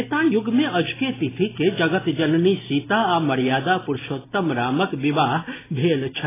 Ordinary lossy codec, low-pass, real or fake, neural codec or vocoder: AAC, 16 kbps; 3.6 kHz; real; none